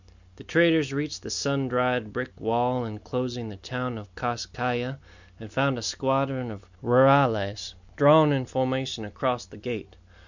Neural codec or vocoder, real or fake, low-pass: none; real; 7.2 kHz